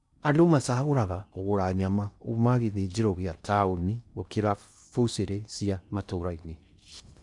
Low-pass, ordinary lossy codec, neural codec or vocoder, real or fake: 10.8 kHz; none; codec, 16 kHz in and 24 kHz out, 0.6 kbps, FocalCodec, streaming, 4096 codes; fake